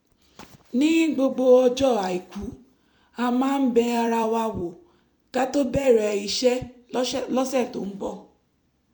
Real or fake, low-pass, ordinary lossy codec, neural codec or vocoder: fake; 19.8 kHz; MP3, 96 kbps; vocoder, 44.1 kHz, 128 mel bands every 256 samples, BigVGAN v2